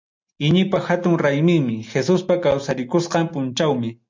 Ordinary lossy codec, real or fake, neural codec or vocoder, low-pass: MP3, 64 kbps; real; none; 7.2 kHz